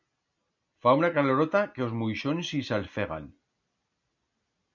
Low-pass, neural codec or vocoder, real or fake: 7.2 kHz; none; real